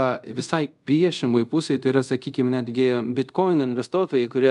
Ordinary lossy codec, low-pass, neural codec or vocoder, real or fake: MP3, 96 kbps; 10.8 kHz; codec, 24 kHz, 0.5 kbps, DualCodec; fake